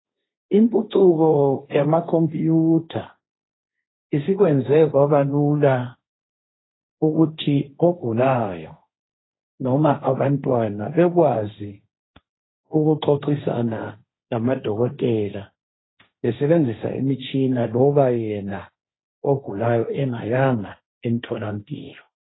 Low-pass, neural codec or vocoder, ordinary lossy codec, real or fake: 7.2 kHz; codec, 16 kHz, 1.1 kbps, Voila-Tokenizer; AAC, 16 kbps; fake